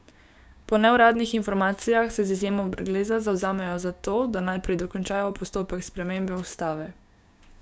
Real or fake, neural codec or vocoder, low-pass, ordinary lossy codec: fake; codec, 16 kHz, 6 kbps, DAC; none; none